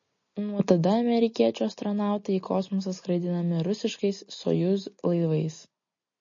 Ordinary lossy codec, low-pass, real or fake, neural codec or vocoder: MP3, 32 kbps; 7.2 kHz; real; none